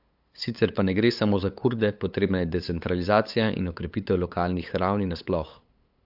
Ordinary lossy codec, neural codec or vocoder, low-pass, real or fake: AAC, 48 kbps; codec, 16 kHz, 8 kbps, FunCodec, trained on LibriTTS, 25 frames a second; 5.4 kHz; fake